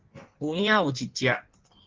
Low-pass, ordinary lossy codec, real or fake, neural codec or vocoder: 7.2 kHz; Opus, 16 kbps; fake; codec, 16 kHz in and 24 kHz out, 1.1 kbps, FireRedTTS-2 codec